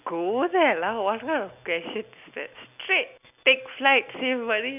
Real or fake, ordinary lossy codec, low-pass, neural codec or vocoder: real; none; 3.6 kHz; none